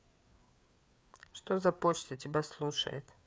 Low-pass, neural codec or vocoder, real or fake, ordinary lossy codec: none; codec, 16 kHz, 4 kbps, FreqCodec, larger model; fake; none